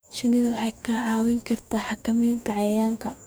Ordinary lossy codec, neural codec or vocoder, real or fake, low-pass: none; codec, 44.1 kHz, 2.6 kbps, DAC; fake; none